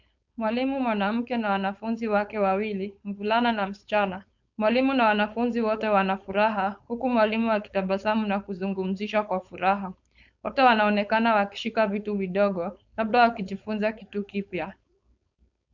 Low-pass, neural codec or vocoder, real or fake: 7.2 kHz; codec, 16 kHz, 4.8 kbps, FACodec; fake